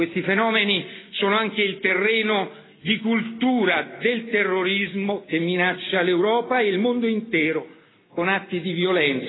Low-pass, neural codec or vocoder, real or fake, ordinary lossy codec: 7.2 kHz; none; real; AAC, 16 kbps